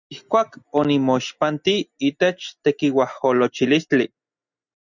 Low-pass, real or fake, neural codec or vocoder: 7.2 kHz; real; none